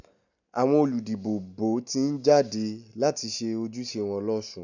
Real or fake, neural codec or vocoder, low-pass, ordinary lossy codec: real; none; 7.2 kHz; none